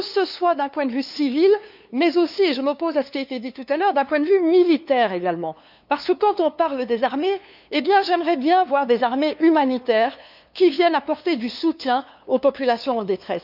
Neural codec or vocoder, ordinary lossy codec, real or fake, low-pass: codec, 16 kHz, 2 kbps, FunCodec, trained on LibriTTS, 25 frames a second; none; fake; 5.4 kHz